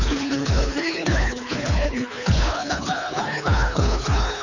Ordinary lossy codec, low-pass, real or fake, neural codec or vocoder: none; 7.2 kHz; fake; codec, 24 kHz, 3 kbps, HILCodec